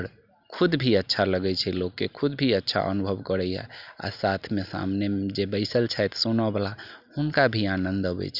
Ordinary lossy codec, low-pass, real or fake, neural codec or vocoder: Opus, 64 kbps; 5.4 kHz; real; none